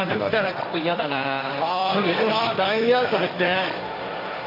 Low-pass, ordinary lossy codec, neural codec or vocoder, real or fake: 5.4 kHz; AAC, 32 kbps; codec, 16 kHz, 1.1 kbps, Voila-Tokenizer; fake